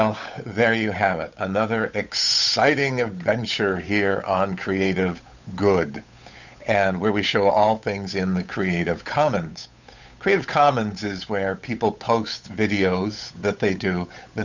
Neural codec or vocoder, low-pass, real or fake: codec, 16 kHz, 8 kbps, FunCodec, trained on Chinese and English, 25 frames a second; 7.2 kHz; fake